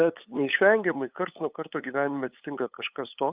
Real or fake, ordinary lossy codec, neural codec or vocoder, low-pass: fake; Opus, 24 kbps; codec, 16 kHz, 8 kbps, FunCodec, trained on LibriTTS, 25 frames a second; 3.6 kHz